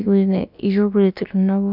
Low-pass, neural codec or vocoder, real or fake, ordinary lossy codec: 5.4 kHz; codec, 16 kHz, about 1 kbps, DyCAST, with the encoder's durations; fake; none